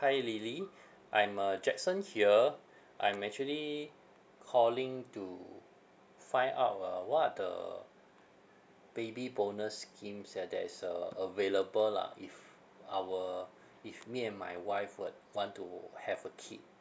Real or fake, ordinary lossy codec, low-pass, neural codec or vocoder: real; none; none; none